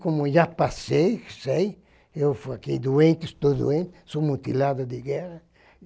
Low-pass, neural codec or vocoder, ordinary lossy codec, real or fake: none; none; none; real